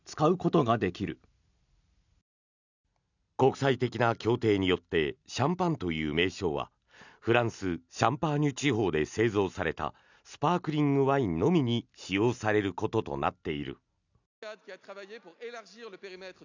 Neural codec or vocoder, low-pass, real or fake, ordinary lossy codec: none; 7.2 kHz; real; none